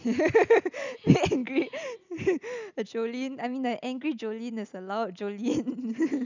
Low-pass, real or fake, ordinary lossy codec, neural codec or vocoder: 7.2 kHz; real; none; none